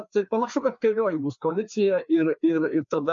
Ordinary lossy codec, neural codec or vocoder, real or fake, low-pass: MP3, 48 kbps; codec, 16 kHz, 2 kbps, FreqCodec, larger model; fake; 7.2 kHz